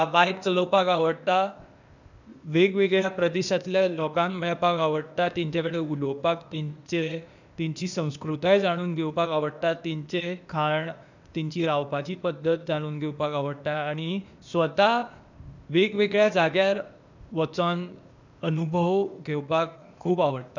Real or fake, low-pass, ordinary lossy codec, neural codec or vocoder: fake; 7.2 kHz; none; codec, 16 kHz, 0.8 kbps, ZipCodec